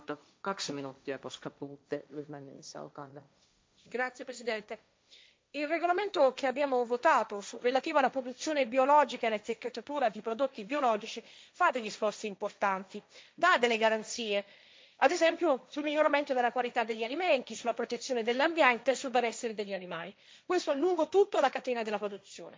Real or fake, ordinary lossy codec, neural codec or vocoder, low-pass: fake; none; codec, 16 kHz, 1.1 kbps, Voila-Tokenizer; none